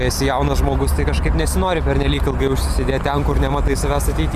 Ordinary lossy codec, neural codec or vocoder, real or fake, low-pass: Opus, 64 kbps; none; real; 14.4 kHz